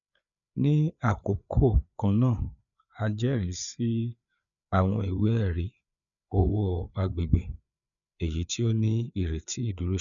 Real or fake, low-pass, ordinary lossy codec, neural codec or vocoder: fake; 7.2 kHz; none; codec, 16 kHz, 4 kbps, FreqCodec, larger model